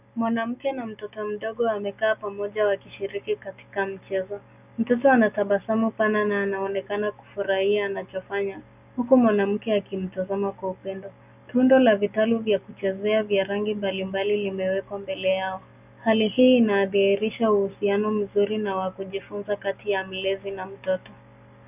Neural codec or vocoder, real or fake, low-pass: none; real; 3.6 kHz